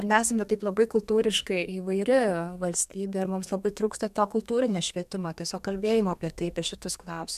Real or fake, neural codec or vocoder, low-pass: fake; codec, 32 kHz, 1.9 kbps, SNAC; 14.4 kHz